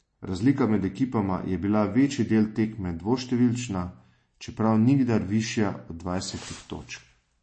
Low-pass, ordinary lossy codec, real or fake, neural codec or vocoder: 9.9 kHz; MP3, 32 kbps; real; none